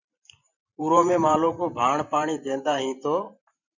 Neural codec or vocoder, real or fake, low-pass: vocoder, 44.1 kHz, 128 mel bands every 512 samples, BigVGAN v2; fake; 7.2 kHz